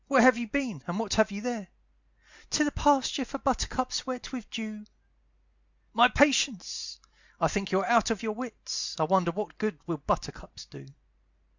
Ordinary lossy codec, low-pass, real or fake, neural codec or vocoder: Opus, 64 kbps; 7.2 kHz; real; none